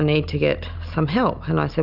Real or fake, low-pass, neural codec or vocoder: real; 5.4 kHz; none